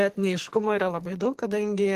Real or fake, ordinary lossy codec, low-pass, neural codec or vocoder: fake; Opus, 16 kbps; 14.4 kHz; codec, 44.1 kHz, 2.6 kbps, SNAC